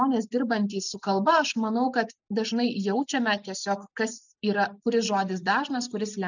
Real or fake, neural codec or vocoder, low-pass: real; none; 7.2 kHz